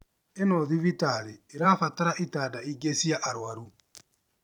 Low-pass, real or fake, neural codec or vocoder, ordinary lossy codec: 19.8 kHz; fake; vocoder, 44.1 kHz, 128 mel bands every 512 samples, BigVGAN v2; none